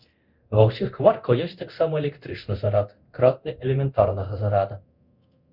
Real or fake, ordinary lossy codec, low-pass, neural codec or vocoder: fake; Opus, 64 kbps; 5.4 kHz; codec, 24 kHz, 0.9 kbps, DualCodec